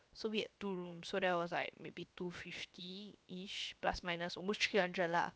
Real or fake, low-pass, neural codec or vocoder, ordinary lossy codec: fake; none; codec, 16 kHz, 0.7 kbps, FocalCodec; none